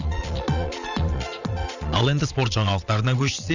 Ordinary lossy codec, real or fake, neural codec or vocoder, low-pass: none; fake; vocoder, 22.05 kHz, 80 mel bands, Vocos; 7.2 kHz